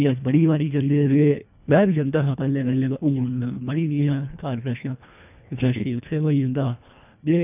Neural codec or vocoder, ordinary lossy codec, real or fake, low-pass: codec, 24 kHz, 1.5 kbps, HILCodec; none; fake; 3.6 kHz